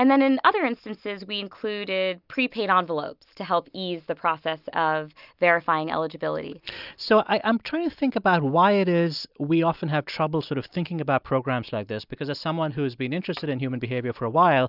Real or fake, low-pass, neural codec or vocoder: real; 5.4 kHz; none